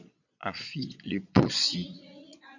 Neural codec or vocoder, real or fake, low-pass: vocoder, 22.05 kHz, 80 mel bands, Vocos; fake; 7.2 kHz